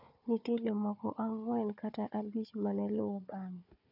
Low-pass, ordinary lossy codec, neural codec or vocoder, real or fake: 5.4 kHz; none; codec, 16 kHz, 4 kbps, FunCodec, trained on Chinese and English, 50 frames a second; fake